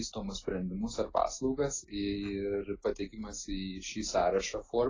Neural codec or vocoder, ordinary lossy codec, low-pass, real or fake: none; AAC, 32 kbps; 7.2 kHz; real